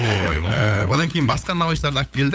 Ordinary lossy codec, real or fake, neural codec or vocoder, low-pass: none; fake; codec, 16 kHz, 8 kbps, FunCodec, trained on LibriTTS, 25 frames a second; none